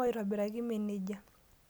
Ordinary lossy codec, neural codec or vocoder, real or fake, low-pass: none; none; real; none